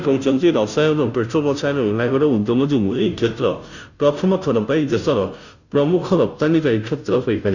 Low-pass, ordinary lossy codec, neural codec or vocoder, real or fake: 7.2 kHz; none; codec, 16 kHz, 0.5 kbps, FunCodec, trained on Chinese and English, 25 frames a second; fake